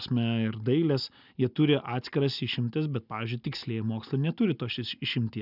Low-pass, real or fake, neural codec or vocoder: 5.4 kHz; real; none